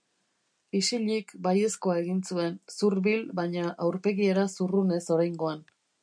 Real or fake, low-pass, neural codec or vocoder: real; 9.9 kHz; none